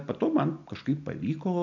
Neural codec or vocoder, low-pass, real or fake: none; 7.2 kHz; real